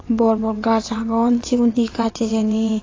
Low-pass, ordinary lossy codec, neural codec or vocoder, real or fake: 7.2 kHz; AAC, 32 kbps; vocoder, 22.05 kHz, 80 mel bands, WaveNeXt; fake